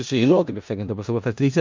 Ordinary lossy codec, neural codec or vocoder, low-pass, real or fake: MP3, 48 kbps; codec, 16 kHz in and 24 kHz out, 0.4 kbps, LongCat-Audio-Codec, four codebook decoder; 7.2 kHz; fake